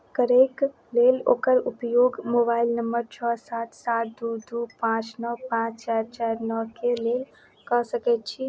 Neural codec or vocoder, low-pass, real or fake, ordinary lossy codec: none; none; real; none